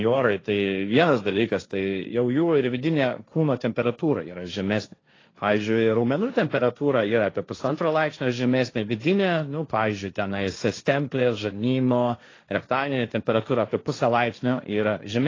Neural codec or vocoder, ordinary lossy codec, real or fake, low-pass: codec, 16 kHz, 1.1 kbps, Voila-Tokenizer; AAC, 32 kbps; fake; 7.2 kHz